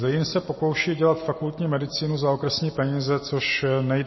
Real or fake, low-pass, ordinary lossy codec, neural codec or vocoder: real; 7.2 kHz; MP3, 24 kbps; none